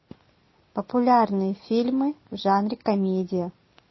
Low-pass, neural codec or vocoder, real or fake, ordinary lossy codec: 7.2 kHz; none; real; MP3, 24 kbps